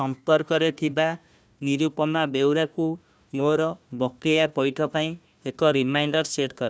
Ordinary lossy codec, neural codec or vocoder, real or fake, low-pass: none; codec, 16 kHz, 1 kbps, FunCodec, trained on Chinese and English, 50 frames a second; fake; none